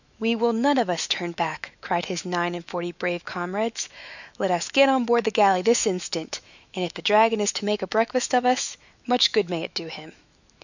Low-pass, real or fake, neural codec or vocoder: 7.2 kHz; fake; autoencoder, 48 kHz, 128 numbers a frame, DAC-VAE, trained on Japanese speech